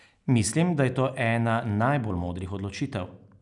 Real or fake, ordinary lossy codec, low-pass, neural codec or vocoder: real; none; 10.8 kHz; none